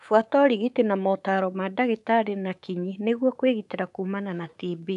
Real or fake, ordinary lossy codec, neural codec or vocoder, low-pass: fake; none; codec, 24 kHz, 3.1 kbps, DualCodec; 10.8 kHz